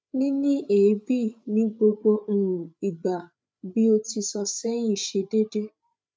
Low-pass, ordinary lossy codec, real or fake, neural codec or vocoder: none; none; fake; codec, 16 kHz, 8 kbps, FreqCodec, larger model